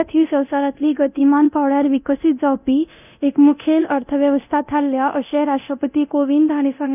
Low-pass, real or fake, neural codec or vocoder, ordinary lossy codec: 3.6 kHz; fake; codec, 24 kHz, 0.9 kbps, DualCodec; none